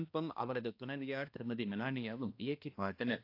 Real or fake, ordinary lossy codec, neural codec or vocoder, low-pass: fake; AAC, 32 kbps; codec, 16 kHz, 1 kbps, X-Codec, HuBERT features, trained on balanced general audio; 5.4 kHz